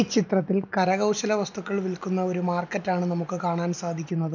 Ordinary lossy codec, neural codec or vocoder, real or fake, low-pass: none; none; real; 7.2 kHz